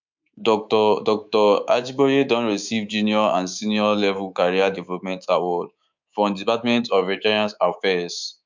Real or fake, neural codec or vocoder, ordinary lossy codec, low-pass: fake; codec, 24 kHz, 3.1 kbps, DualCodec; MP3, 64 kbps; 7.2 kHz